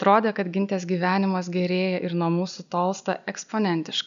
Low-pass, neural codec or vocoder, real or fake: 7.2 kHz; none; real